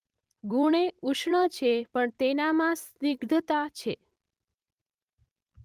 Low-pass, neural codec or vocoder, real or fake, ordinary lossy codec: 14.4 kHz; vocoder, 44.1 kHz, 128 mel bands every 256 samples, BigVGAN v2; fake; Opus, 32 kbps